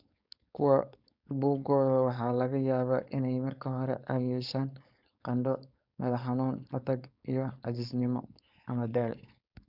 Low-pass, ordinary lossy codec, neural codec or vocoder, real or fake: 5.4 kHz; none; codec, 16 kHz, 4.8 kbps, FACodec; fake